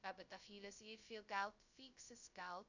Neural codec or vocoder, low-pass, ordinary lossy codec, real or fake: codec, 16 kHz, 0.2 kbps, FocalCodec; 7.2 kHz; none; fake